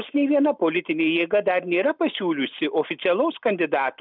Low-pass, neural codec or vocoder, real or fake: 5.4 kHz; none; real